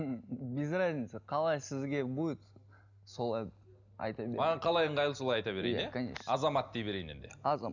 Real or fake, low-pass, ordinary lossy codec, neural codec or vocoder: real; 7.2 kHz; none; none